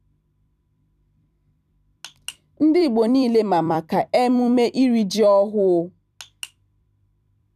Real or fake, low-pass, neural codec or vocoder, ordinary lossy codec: fake; 14.4 kHz; vocoder, 44.1 kHz, 128 mel bands every 256 samples, BigVGAN v2; none